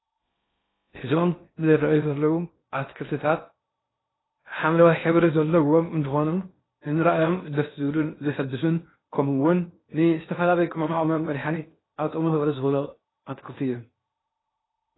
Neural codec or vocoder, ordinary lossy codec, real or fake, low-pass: codec, 16 kHz in and 24 kHz out, 0.6 kbps, FocalCodec, streaming, 4096 codes; AAC, 16 kbps; fake; 7.2 kHz